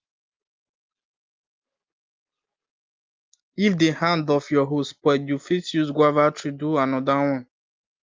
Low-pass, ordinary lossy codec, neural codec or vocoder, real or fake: 7.2 kHz; Opus, 24 kbps; none; real